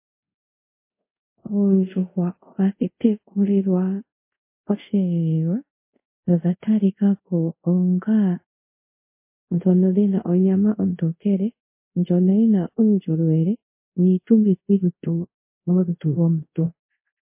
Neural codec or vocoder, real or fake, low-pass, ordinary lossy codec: codec, 24 kHz, 0.5 kbps, DualCodec; fake; 3.6 kHz; MP3, 24 kbps